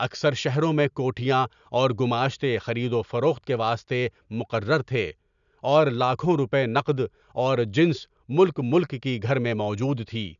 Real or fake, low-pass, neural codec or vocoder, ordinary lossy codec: real; 7.2 kHz; none; none